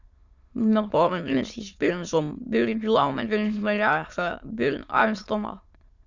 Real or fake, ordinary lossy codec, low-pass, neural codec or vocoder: fake; Opus, 64 kbps; 7.2 kHz; autoencoder, 22.05 kHz, a latent of 192 numbers a frame, VITS, trained on many speakers